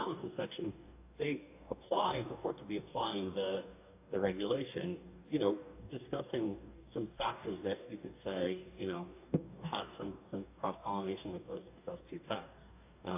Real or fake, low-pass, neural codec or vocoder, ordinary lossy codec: fake; 3.6 kHz; codec, 44.1 kHz, 2.6 kbps, DAC; AAC, 32 kbps